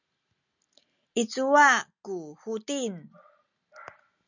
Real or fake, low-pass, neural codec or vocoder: real; 7.2 kHz; none